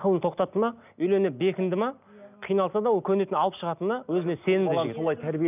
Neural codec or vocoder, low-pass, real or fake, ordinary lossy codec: none; 3.6 kHz; real; none